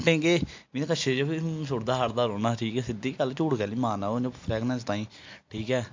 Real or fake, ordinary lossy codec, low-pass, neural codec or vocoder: real; MP3, 48 kbps; 7.2 kHz; none